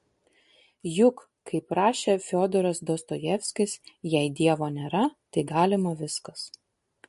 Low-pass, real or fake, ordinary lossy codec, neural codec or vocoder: 14.4 kHz; real; MP3, 48 kbps; none